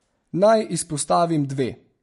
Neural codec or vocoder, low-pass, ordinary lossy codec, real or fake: none; 14.4 kHz; MP3, 48 kbps; real